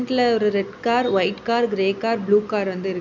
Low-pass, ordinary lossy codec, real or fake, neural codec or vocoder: 7.2 kHz; none; real; none